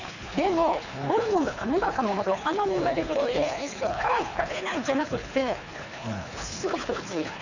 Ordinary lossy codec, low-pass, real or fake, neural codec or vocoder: none; 7.2 kHz; fake; codec, 24 kHz, 3 kbps, HILCodec